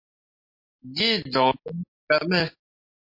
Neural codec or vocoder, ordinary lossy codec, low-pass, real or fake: none; MP3, 32 kbps; 5.4 kHz; real